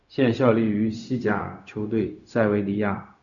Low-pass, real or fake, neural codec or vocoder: 7.2 kHz; fake; codec, 16 kHz, 0.4 kbps, LongCat-Audio-Codec